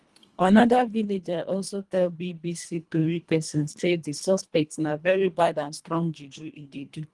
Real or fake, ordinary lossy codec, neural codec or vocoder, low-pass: fake; Opus, 24 kbps; codec, 24 kHz, 1.5 kbps, HILCodec; 10.8 kHz